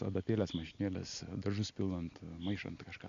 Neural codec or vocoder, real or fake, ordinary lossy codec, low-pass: none; real; Opus, 64 kbps; 7.2 kHz